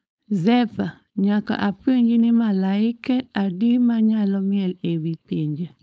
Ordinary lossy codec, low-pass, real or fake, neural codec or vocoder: none; none; fake; codec, 16 kHz, 4.8 kbps, FACodec